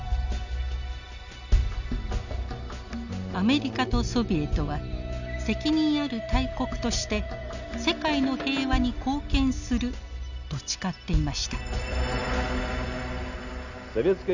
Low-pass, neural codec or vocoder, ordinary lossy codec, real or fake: 7.2 kHz; none; none; real